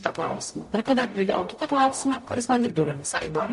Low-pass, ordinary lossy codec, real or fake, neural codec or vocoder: 14.4 kHz; MP3, 48 kbps; fake; codec, 44.1 kHz, 0.9 kbps, DAC